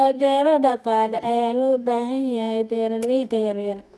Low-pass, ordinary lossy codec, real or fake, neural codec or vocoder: none; none; fake; codec, 24 kHz, 0.9 kbps, WavTokenizer, medium music audio release